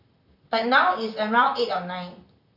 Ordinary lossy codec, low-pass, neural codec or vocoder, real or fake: none; 5.4 kHz; vocoder, 44.1 kHz, 128 mel bands, Pupu-Vocoder; fake